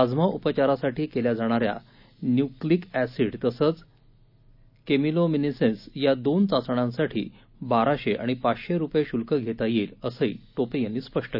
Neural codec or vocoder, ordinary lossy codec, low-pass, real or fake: none; none; 5.4 kHz; real